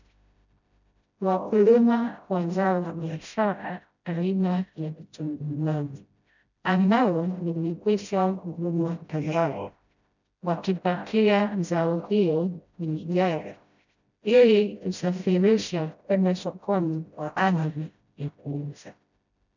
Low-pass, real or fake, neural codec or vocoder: 7.2 kHz; fake; codec, 16 kHz, 0.5 kbps, FreqCodec, smaller model